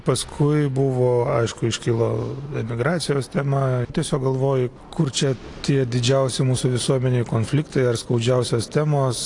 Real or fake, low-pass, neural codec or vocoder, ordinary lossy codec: real; 10.8 kHz; none; AAC, 48 kbps